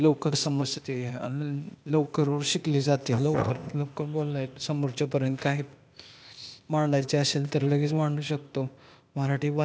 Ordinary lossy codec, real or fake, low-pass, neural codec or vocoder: none; fake; none; codec, 16 kHz, 0.8 kbps, ZipCodec